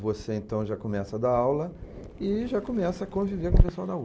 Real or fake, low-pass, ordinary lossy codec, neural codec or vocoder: real; none; none; none